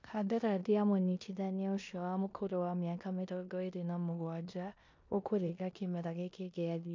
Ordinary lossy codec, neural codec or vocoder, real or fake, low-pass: MP3, 48 kbps; codec, 16 kHz in and 24 kHz out, 0.9 kbps, LongCat-Audio-Codec, four codebook decoder; fake; 7.2 kHz